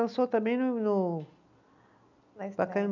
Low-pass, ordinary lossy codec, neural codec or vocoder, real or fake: 7.2 kHz; none; none; real